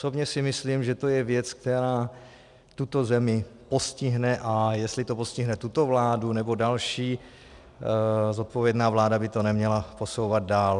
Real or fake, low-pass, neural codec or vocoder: real; 10.8 kHz; none